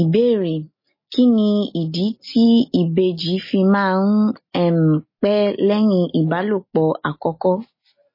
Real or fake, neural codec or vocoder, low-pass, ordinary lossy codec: real; none; 5.4 kHz; MP3, 24 kbps